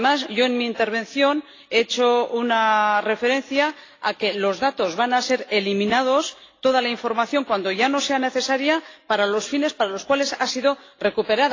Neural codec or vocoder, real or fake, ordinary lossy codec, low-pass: none; real; AAC, 32 kbps; 7.2 kHz